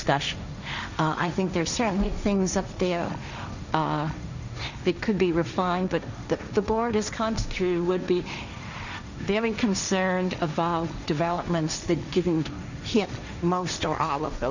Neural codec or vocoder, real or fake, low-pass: codec, 16 kHz, 1.1 kbps, Voila-Tokenizer; fake; 7.2 kHz